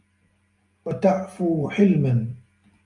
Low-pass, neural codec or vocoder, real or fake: 10.8 kHz; none; real